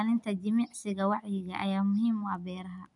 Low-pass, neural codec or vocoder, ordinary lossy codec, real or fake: 10.8 kHz; none; none; real